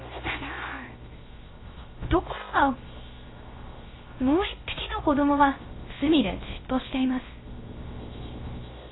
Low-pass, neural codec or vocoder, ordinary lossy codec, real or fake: 7.2 kHz; codec, 16 kHz, 0.3 kbps, FocalCodec; AAC, 16 kbps; fake